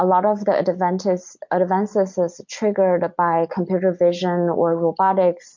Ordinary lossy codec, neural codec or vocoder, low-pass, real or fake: MP3, 48 kbps; none; 7.2 kHz; real